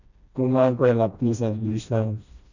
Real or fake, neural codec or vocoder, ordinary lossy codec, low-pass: fake; codec, 16 kHz, 1 kbps, FreqCodec, smaller model; none; 7.2 kHz